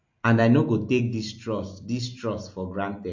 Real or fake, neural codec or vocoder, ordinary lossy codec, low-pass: real; none; MP3, 48 kbps; 7.2 kHz